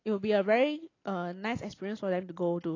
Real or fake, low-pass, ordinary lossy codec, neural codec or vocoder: real; 7.2 kHz; AAC, 32 kbps; none